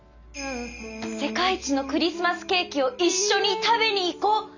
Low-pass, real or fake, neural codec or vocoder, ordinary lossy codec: 7.2 kHz; real; none; none